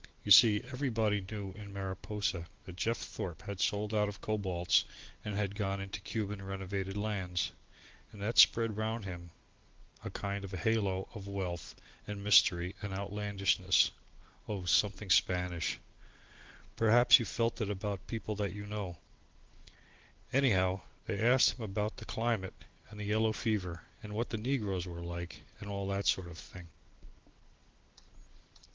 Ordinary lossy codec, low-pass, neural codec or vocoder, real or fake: Opus, 16 kbps; 7.2 kHz; none; real